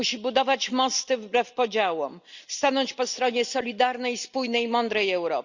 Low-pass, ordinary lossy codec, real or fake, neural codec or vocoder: 7.2 kHz; Opus, 64 kbps; real; none